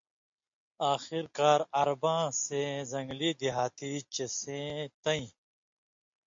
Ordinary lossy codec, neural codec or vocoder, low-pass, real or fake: MP3, 48 kbps; none; 7.2 kHz; real